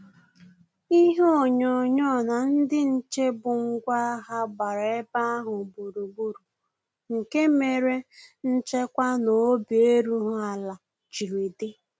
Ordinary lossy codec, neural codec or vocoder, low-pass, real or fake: none; none; none; real